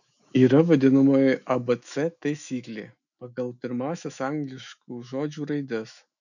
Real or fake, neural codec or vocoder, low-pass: fake; autoencoder, 48 kHz, 128 numbers a frame, DAC-VAE, trained on Japanese speech; 7.2 kHz